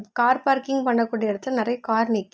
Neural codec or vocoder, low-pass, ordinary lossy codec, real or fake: none; none; none; real